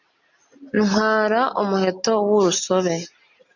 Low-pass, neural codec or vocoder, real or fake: 7.2 kHz; none; real